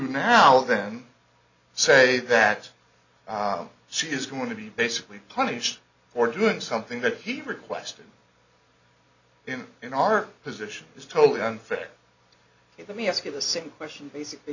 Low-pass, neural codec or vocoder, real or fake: 7.2 kHz; none; real